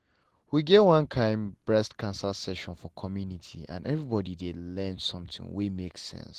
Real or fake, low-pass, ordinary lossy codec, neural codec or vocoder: real; 14.4 kHz; Opus, 16 kbps; none